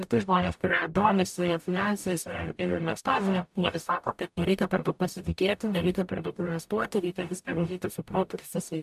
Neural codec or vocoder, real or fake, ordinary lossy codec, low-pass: codec, 44.1 kHz, 0.9 kbps, DAC; fake; AAC, 96 kbps; 14.4 kHz